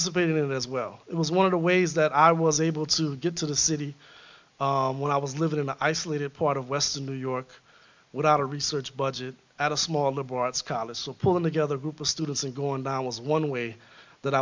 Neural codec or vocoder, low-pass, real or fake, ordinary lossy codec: none; 7.2 kHz; real; MP3, 64 kbps